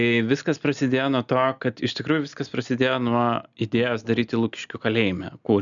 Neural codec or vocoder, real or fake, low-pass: none; real; 7.2 kHz